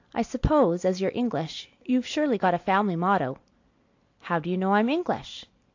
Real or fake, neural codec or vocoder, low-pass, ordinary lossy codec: real; none; 7.2 kHz; AAC, 48 kbps